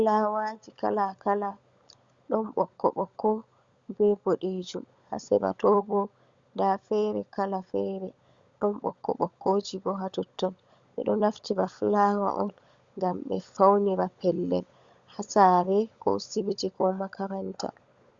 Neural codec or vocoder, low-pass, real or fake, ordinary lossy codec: codec, 16 kHz, 16 kbps, FunCodec, trained on LibriTTS, 50 frames a second; 7.2 kHz; fake; Opus, 64 kbps